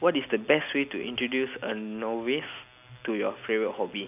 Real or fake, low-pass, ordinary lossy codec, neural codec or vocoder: real; 3.6 kHz; none; none